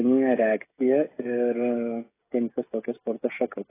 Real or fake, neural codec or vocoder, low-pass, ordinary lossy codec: fake; codec, 16 kHz, 16 kbps, FreqCodec, smaller model; 3.6 kHz; AAC, 16 kbps